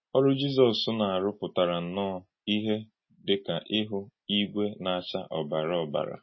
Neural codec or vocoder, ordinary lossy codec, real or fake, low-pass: none; MP3, 24 kbps; real; 7.2 kHz